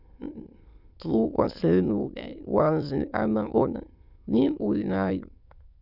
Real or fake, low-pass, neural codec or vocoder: fake; 5.4 kHz; autoencoder, 22.05 kHz, a latent of 192 numbers a frame, VITS, trained on many speakers